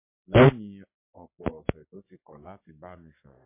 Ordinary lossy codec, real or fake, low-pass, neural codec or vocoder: MP3, 24 kbps; fake; 3.6 kHz; codec, 44.1 kHz, 3.4 kbps, Pupu-Codec